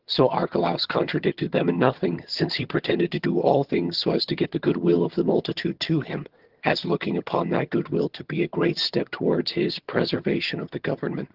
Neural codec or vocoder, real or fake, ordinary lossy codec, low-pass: vocoder, 22.05 kHz, 80 mel bands, HiFi-GAN; fake; Opus, 16 kbps; 5.4 kHz